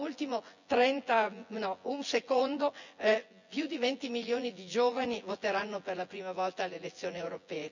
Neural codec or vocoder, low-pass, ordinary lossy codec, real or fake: vocoder, 24 kHz, 100 mel bands, Vocos; 7.2 kHz; none; fake